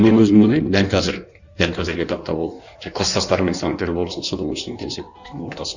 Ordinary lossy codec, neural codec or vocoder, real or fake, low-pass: none; codec, 16 kHz in and 24 kHz out, 1.1 kbps, FireRedTTS-2 codec; fake; 7.2 kHz